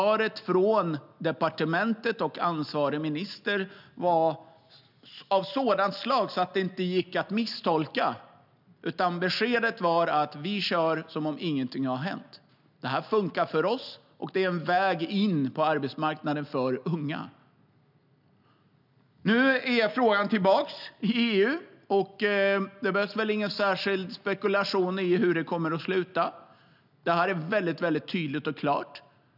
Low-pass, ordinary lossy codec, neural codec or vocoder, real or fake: 5.4 kHz; none; none; real